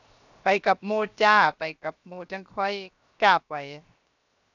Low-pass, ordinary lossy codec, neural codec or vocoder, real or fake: 7.2 kHz; none; codec, 16 kHz, 0.7 kbps, FocalCodec; fake